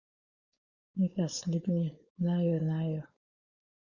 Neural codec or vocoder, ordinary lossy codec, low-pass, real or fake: codec, 16 kHz, 4.8 kbps, FACodec; Opus, 64 kbps; 7.2 kHz; fake